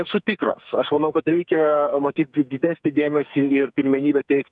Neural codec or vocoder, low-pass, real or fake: codec, 44.1 kHz, 2.6 kbps, SNAC; 10.8 kHz; fake